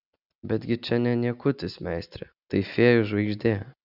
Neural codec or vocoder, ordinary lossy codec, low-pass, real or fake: none; Opus, 64 kbps; 5.4 kHz; real